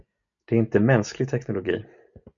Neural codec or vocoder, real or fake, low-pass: none; real; 7.2 kHz